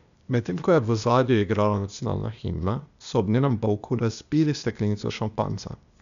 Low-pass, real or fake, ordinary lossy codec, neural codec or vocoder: 7.2 kHz; fake; none; codec, 16 kHz, 0.8 kbps, ZipCodec